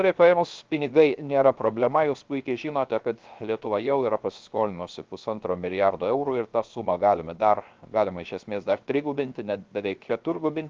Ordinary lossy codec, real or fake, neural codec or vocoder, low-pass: Opus, 24 kbps; fake; codec, 16 kHz, 0.7 kbps, FocalCodec; 7.2 kHz